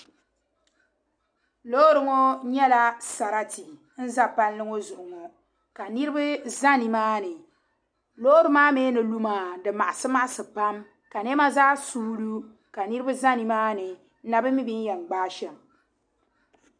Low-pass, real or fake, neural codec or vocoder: 9.9 kHz; real; none